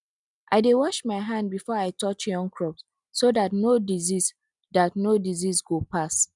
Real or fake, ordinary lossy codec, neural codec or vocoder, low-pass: real; none; none; 10.8 kHz